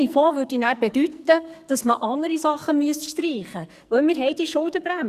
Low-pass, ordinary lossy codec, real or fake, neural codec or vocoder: 14.4 kHz; Opus, 64 kbps; fake; codec, 44.1 kHz, 2.6 kbps, SNAC